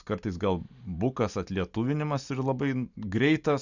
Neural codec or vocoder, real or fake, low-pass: none; real; 7.2 kHz